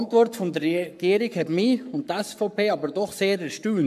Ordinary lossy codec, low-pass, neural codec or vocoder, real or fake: MP3, 96 kbps; 14.4 kHz; codec, 44.1 kHz, 7.8 kbps, Pupu-Codec; fake